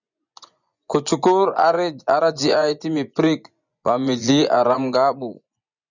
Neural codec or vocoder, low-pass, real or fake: vocoder, 24 kHz, 100 mel bands, Vocos; 7.2 kHz; fake